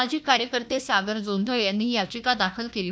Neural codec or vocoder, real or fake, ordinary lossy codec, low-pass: codec, 16 kHz, 1 kbps, FunCodec, trained on Chinese and English, 50 frames a second; fake; none; none